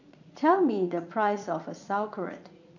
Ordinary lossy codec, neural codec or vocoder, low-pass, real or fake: none; vocoder, 44.1 kHz, 80 mel bands, Vocos; 7.2 kHz; fake